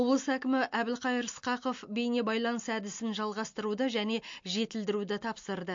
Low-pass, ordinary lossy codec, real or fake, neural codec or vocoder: 7.2 kHz; MP3, 48 kbps; real; none